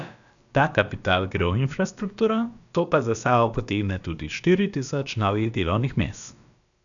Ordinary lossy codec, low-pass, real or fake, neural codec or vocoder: none; 7.2 kHz; fake; codec, 16 kHz, about 1 kbps, DyCAST, with the encoder's durations